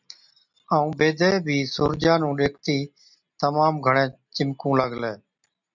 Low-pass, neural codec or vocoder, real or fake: 7.2 kHz; none; real